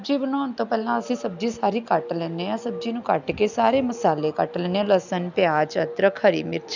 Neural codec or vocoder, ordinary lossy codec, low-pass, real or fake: none; none; 7.2 kHz; real